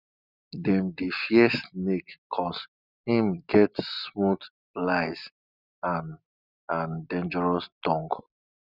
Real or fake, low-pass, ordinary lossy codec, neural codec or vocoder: real; 5.4 kHz; none; none